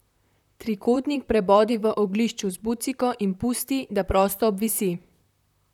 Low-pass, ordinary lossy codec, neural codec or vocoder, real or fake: 19.8 kHz; none; vocoder, 44.1 kHz, 128 mel bands, Pupu-Vocoder; fake